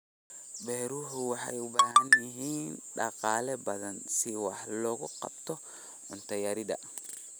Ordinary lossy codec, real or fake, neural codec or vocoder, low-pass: none; real; none; none